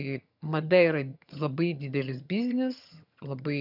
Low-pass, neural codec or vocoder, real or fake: 5.4 kHz; vocoder, 22.05 kHz, 80 mel bands, HiFi-GAN; fake